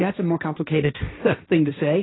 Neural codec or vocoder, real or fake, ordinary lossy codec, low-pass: codec, 16 kHz, 1.1 kbps, Voila-Tokenizer; fake; AAC, 16 kbps; 7.2 kHz